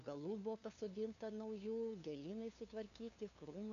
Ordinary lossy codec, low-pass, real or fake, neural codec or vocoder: MP3, 96 kbps; 7.2 kHz; fake; codec, 16 kHz, 2 kbps, FunCodec, trained on LibriTTS, 25 frames a second